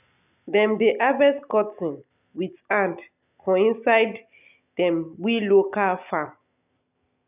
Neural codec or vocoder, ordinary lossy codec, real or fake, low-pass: none; none; real; 3.6 kHz